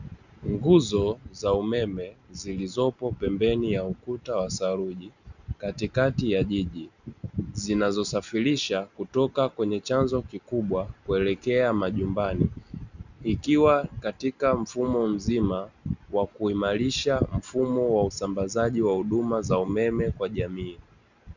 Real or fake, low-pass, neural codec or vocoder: real; 7.2 kHz; none